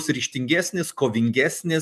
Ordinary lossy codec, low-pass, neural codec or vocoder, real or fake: MP3, 96 kbps; 14.4 kHz; none; real